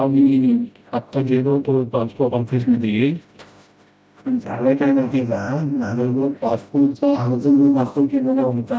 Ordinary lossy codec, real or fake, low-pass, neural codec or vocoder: none; fake; none; codec, 16 kHz, 0.5 kbps, FreqCodec, smaller model